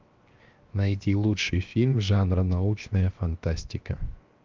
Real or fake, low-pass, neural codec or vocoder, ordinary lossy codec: fake; 7.2 kHz; codec, 16 kHz, 0.7 kbps, FocalCodec; Opus, 24 kbps